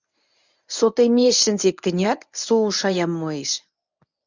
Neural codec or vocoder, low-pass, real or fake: codec, 24 kHz, 0.9 kbps, WavTokenizer, medium speech release version 1; 7.2 kHz; fake